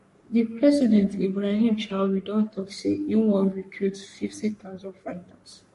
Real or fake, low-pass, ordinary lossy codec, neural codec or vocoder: fake; 14.4 kHz; MP3, 48 kbps; codec, 44.1 kHz, 3.4 kbps, Pupu-Codec